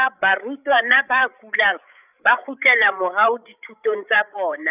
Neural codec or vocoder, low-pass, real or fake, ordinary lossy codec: codec, 16 kHz, 8 kbps, FreqCodec, larger model; 3.6 kHz; fake; none